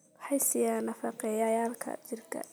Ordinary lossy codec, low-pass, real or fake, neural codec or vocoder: none; none; real; none